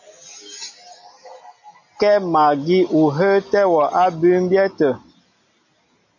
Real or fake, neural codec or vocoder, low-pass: real; none; 7.2 kHz